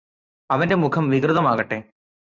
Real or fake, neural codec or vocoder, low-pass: fake; vocoder, 44.1 kHz, 128 mel bands every 512 samples, BigVGAN v2; 7.2 kHz